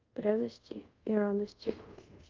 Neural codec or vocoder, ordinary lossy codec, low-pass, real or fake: codec, 24 kHz, 0.5 kbps, DualCodec; Opus, 24 kbps; 7.2 kHz; fake